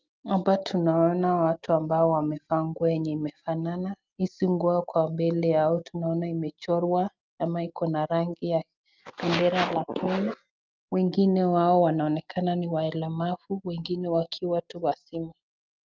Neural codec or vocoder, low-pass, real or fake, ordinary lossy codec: none; 7.2 kHz; real; Opus, 24 kbps